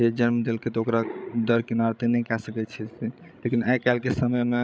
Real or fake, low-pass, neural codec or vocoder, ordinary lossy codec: fake; none; codec, 16 kHz, 16 kbps, FreqCodec, larger model; none